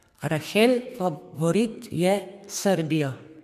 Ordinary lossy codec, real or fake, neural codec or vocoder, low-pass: MP3, 96 kbps; fake; codec, 32 kHz, 1.9 kbps, SNAC; 14.4 kHz